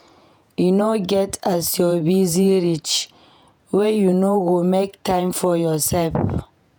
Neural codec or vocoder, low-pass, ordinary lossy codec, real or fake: vocoder, 48 kHz, 128 mel bands, Vocos; none; none; fake